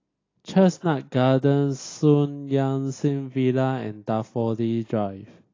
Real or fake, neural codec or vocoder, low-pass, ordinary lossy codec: real; none; 7.2 kHz; AAC, 32 kbps